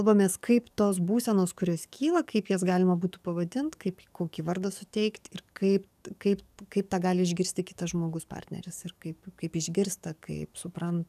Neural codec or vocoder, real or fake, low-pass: codec, 44.1 kHz, 7.8 kbps, DAC; fake; 14.4 kHz